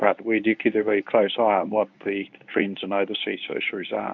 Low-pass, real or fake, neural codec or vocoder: 7.2 kHz; fake; codec, 24 kHz, 0.9 kbps, WavTokenizer, medium speech release version 1